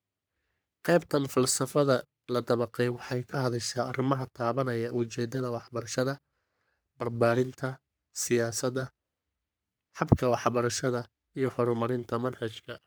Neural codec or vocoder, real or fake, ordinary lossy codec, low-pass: codec, 44.1 kHz, 3.4 kbps, Pupu-Codec; fake; none; none